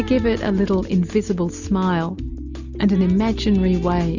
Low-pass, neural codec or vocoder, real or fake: 7.2 kHz; none; real